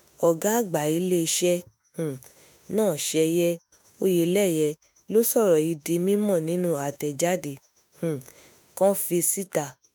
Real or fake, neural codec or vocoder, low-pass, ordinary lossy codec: fake; autoencoder, 48 kHz, 32 numbers a frame, DAC-VAE, trained on Japanese speech; none; none